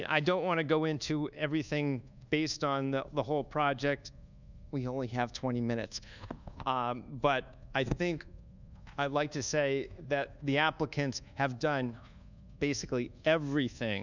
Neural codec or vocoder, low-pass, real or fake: codec, 24 kHz, 1.2 kbps, DualCodec; 7.2 kHz; fake